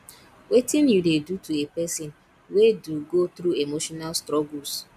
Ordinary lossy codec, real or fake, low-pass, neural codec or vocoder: none; real; 14.4 kHz; none